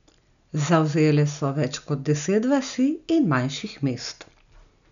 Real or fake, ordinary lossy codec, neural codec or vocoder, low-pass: real; none; none; 7.2 kHz